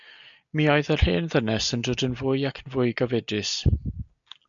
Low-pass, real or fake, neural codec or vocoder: 7.2 kHz; real; none